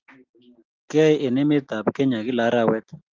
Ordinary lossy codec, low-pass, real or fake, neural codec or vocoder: Opus, 32 kbps; 7.2 kHz; real; none